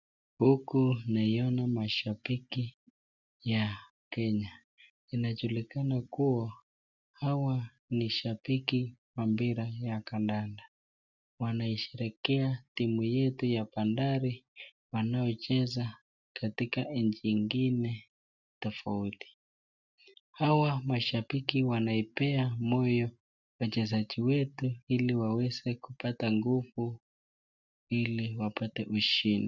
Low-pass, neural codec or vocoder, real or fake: 7.2 kHz; none; real